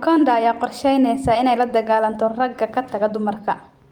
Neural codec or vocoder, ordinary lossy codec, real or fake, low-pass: vocoder, 44.1 kHz, 128 mel bands every 256 samples, BigVGAN v2; Opus, 24 kbps; fake; 19.8 kHz